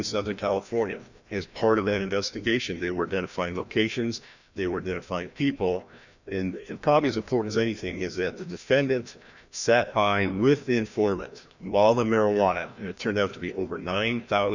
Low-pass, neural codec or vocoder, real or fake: 7.2 kHz; codec, 16 kHz, 1 kbps, FreqCodec, larger model; fake